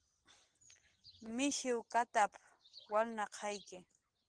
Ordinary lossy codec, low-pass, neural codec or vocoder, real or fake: Opus, 16 kbps; 9.9 kHz; none; real